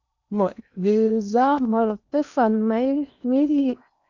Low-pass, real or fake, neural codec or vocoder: 7.2 kHz; fake; codec, 16 kHz in and 24 kHz out, 0.8 kbps, FocalCodec, streaming, 65536 codes